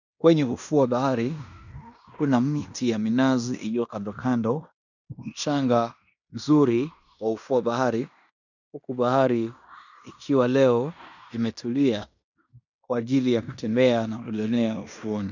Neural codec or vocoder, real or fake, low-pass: codec, 16 kHz in and 24 kHz out, 0.9 kbps, LongCat-Audio-Codec, fine tuned four codebook decoder; fake; 7.2 kHz